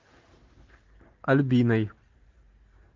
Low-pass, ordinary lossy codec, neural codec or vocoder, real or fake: 7.2 kHz; Opus, 24 kbps; none; real